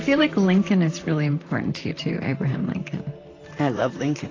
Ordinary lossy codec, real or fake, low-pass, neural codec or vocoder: AAC, 32 kbps; fake; 7.2 kHz; vocoder, 44.1 kHz, 128 mel bands, Pupu-Vocoder